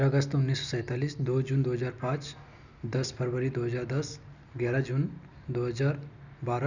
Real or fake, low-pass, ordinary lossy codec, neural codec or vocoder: real; 7.2 kHz; none; none